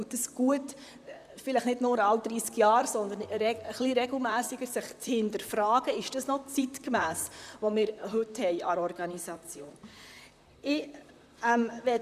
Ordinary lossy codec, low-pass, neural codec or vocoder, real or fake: none; 14.4 kHz; vocoder, 44.1 kHz, 128 mel bands, Pupu-Vocoder; fake